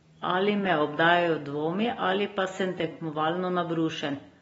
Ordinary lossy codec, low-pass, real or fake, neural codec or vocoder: AAC, 24 kbps; 14.4 kHz; real; none